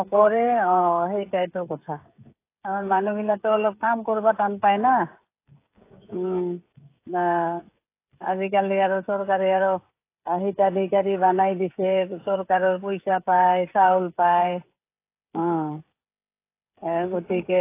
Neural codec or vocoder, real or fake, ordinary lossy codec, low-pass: codec, 16 kHz, 16 kbps, FreqCodec, smaller model; fake; AAC, 24 kbps; 3.6 kHz